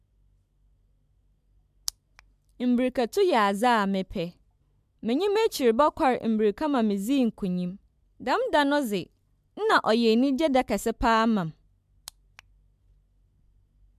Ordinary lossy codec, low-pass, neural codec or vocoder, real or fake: MP3, 96 kbps; 14.4 kHz; none; real